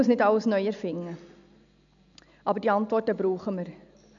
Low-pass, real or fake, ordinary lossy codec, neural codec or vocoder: 7.2 kHz; real; none; none